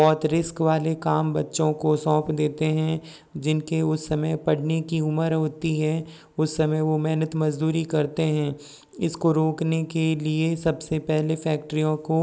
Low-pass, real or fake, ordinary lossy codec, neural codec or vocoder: none; real; none; none